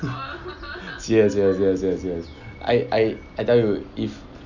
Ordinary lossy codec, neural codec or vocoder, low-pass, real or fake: none; none; 7.2 kHz; real